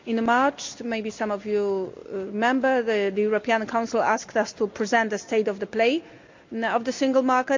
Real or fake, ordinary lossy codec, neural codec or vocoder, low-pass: real; MP3, 64 kbps; none; 7.2 kHz